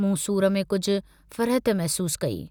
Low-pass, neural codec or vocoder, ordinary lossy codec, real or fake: none; none; none; real